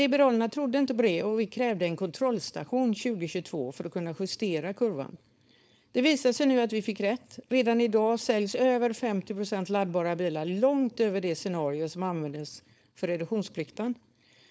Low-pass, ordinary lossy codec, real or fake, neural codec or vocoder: none; none; fake; codec, 16 kHz, 4.8 kbps, FACodec